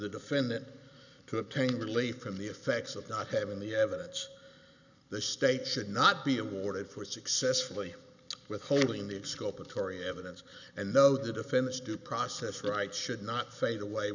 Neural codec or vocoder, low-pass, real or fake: none; 7.2 kHz; real